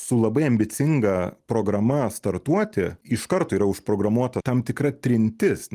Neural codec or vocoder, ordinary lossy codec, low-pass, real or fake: vocoder, 44.1 kHz, 128 mel bands every 256 samples, BigVGAN v2; Opus, 32 kbps; 14.4 kHz; fake